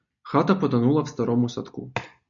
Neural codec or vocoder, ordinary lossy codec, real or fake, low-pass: none; MP3, 96 kbps; real; 7.2 kHz